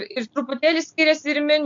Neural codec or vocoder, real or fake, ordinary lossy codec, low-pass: none; real; MP3, 48 kbps; 7.2 kHz